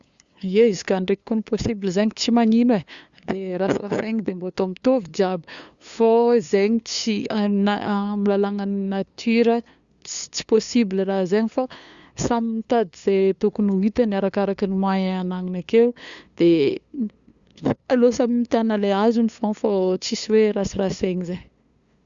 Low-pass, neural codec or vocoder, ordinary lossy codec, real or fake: 7.2 kHz; codec, 16 kHz, 2 kbps, FunCodec, trained on LibriTTS, 25 frames a second; Opus, 64 kbps; fake